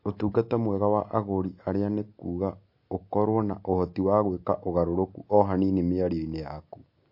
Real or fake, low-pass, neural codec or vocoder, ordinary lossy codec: real; 5.4 kHz; none; MP3, 32 kbps